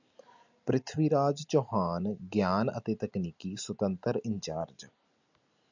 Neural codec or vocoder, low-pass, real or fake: none; 7.2 kHz; real